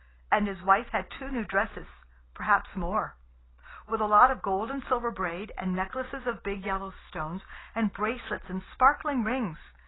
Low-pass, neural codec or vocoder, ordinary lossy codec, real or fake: 7.2 kHz; none; AAC, 16 kbps; real